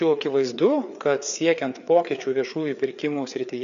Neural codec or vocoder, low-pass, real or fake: codec, 16 kHz, 4 kbps, FreqCodec, larger model; 7.2 kHz; fake